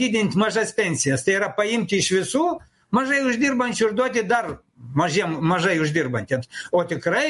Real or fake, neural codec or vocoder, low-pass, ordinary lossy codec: real; none; 14.4 kHz; MP3, 48 kbps